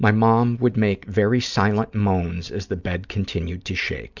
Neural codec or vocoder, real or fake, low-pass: none; real; 7.2 kHz